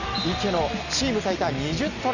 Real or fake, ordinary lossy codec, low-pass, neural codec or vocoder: real; none; 7.2 kHz; none